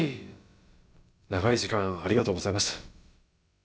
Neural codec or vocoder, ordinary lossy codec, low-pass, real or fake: codec, 16 kHz, about 1 kbps, DyCAST, with the encoder's durations; none; none; fake